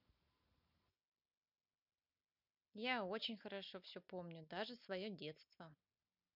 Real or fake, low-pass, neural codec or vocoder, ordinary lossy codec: real; 5.4 kHz; none; none